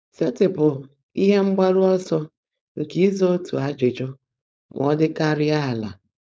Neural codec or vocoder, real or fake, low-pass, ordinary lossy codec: codec, 16 kHz, 4.8 kbps, FACodec; fake; none; none